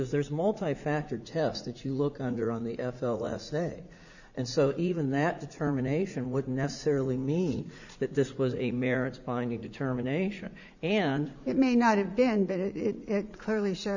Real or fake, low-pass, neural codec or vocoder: fake; 7.2 kHz; vocoder, 44.1 kHz, 80 mel bands, Vocos